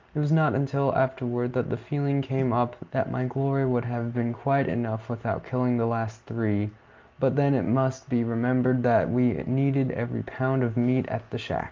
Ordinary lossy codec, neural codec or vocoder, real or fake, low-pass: Opus, 24 kbps; none; real; 7.2 kHz